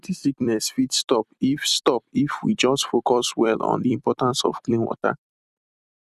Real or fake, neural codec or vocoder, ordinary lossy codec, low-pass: fake; vocoder, 44.1 kHz, 128 mel bands every 256 samples, BigVGAN v2; none; 14.4 kHz